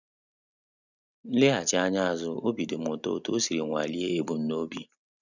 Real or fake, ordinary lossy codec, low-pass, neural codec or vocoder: real; none; 7.2 kHz; none